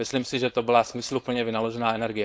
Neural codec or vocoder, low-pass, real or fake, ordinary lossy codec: codec, 16 kHz, 4.8 kbps, FACodec; none; fake; none